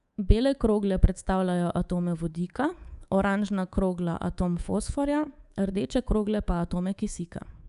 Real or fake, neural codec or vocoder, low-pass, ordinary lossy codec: fake; codec, 24 kHz, 3.1 kbps, DualCodec; 10.8 kHz; none